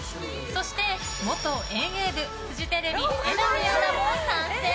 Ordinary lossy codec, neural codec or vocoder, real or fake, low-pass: none; none; real; none